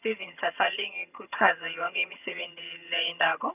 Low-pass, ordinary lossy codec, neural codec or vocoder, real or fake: 3.6 kHz; none; vocoder, 22.05 kHz, 80 mel bands, HiFi-GAN; fake